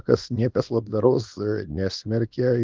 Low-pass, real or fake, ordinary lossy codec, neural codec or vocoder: 7.2 kHz; fake; Opus, 32 kbps; codec, 24 kHz, 0.9 kbps, WavTokenizer, small release